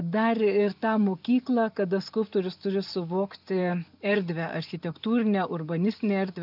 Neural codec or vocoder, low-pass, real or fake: none; 5.4 kHz; real